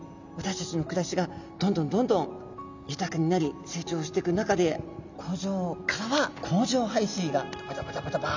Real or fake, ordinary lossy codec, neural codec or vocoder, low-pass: real; none; none; 7.2 kHz